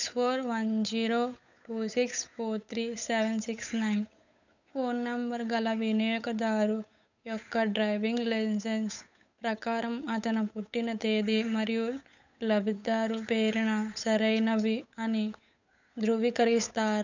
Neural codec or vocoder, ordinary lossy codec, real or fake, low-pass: codec, 16 kHz, 8 kbps, FunCodec, trained on Chinese and English, 25 frames a second; none; fake; 7.2 kHz